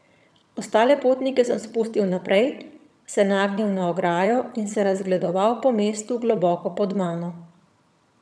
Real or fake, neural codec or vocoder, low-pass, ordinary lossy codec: fake; vocoder, 22.05 kHz, 80 mel bands, HiFi-GAN; none; none